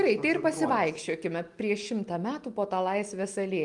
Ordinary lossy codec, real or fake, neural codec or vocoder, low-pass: Opus, 32 kbps; real; none; 10.8 kHz